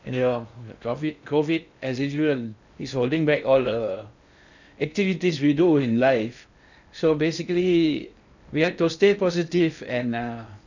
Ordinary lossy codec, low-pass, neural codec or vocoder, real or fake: none; 7.2 kHz; codec, 16 kHz in and 24 kHz out, 0.6 kbps, FocalCodec, streaming, 2048 codes; fake